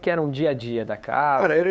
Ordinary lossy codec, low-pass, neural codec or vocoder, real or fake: none; none; codec, 16 kHz, 8 kbps, FunCodec, trained on LibriTTS, 25 frames a second; fake